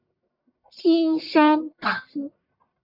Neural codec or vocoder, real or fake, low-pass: codec, 44.1 kHz, 1.7 kbps, Pupu-Codec; fake; 5.4 kHz